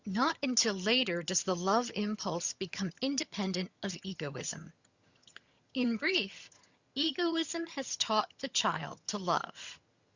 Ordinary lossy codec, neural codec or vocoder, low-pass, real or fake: Opus, 64 kbps; vocoder, 22.05 kHz, 80 mel bands, HiFi-GAN; 7.2 kHz; fake